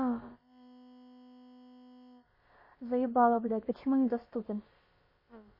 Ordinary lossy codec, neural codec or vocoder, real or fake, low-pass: MP3, 24 kbps; codec, 16 kHz, about 1 kbps, DyCAST, with the encoder's durations; fake; 5.4 kHz